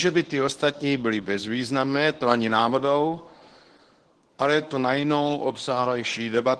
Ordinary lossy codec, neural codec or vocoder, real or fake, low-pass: Opus, 16 kbps; codec, 24 kHz, 0.9 kbps, WavTokenizer, medium speech release version 1; fake; 10.8 kHz